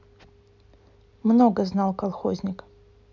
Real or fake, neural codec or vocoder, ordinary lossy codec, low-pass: real; none; none; 7.2 kHz